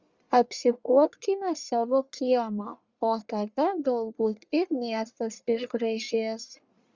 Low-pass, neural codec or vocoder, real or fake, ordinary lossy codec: 7.2 kHz; codec, 44.1 kHz, 1.7 kbps, Pupu-Codec; fake; Opus, 64 kbps